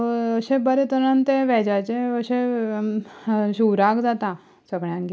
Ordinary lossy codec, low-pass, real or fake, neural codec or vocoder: none; none; real; none